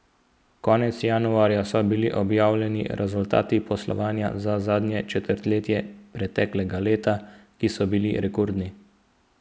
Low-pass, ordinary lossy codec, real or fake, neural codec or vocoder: none; none; real; none